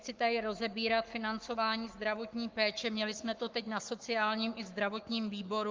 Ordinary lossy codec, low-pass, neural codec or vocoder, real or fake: Opus, 32 kbps; 7.2 kHz; codec, 44.1 kHz, 7.8 kbps, Pupu-Codec; fake